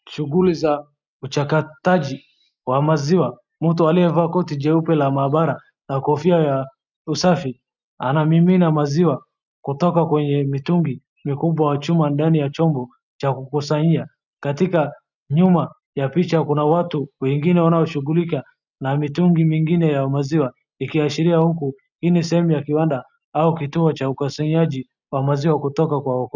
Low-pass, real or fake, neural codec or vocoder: 7.2 kHz; real; none